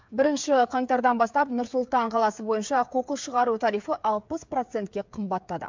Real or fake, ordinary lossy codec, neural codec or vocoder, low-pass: fake; MP3, 64 kbps; codec, 16 kHz, 8 kbps, FreqCodec, smaller model; 7.2 kHz